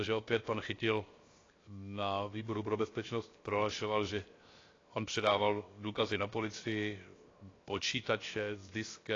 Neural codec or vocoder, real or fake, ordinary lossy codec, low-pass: codec, 16 kHz, 0.7 kbps, FocalCodec; fake; AAC, 32 kbps; 7.2 kHz